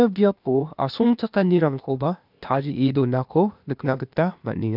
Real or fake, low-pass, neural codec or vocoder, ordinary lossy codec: fake; 5.4 kHz; codec, 16 kHz, 0.8 kbps, ZipCodec; none